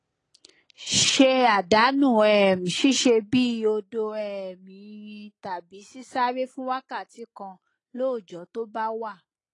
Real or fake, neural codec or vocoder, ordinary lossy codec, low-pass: real; none; AAC, 32 kbps; 10.8 kHz